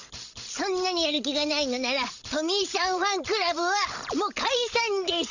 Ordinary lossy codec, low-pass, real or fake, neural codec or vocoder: none; 7.2 kHz; fake; codec, 16 kHz, 16 kbps, FreqCodec, larger model